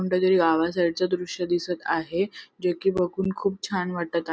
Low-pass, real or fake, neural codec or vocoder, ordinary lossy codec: none; real; none; none